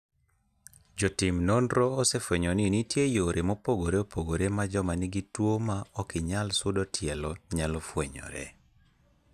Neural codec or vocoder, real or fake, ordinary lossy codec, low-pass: none; real; none; 14.4 kHz